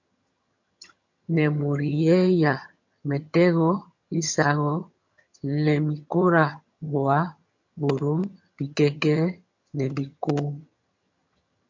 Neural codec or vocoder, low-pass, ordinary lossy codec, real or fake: vocoder, 22.05 kHz, 80 mel bands, HiFi-GAN; 7.2 kHz; MP3, 48 kbps; fake